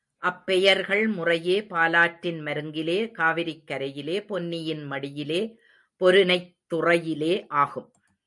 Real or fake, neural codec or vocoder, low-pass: real; none; 10.8 kHz